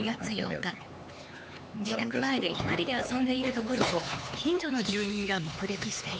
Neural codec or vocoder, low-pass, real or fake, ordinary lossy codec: codec, 16 kHz, 4 kbps, X-Codec, HuBERT features, trained on LibriSpeech; none; fake; none